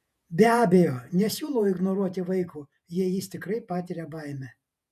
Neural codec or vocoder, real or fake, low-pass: vocoder, 48 kHz, 128 mel bands, Vocos; fake; 14.4 kHz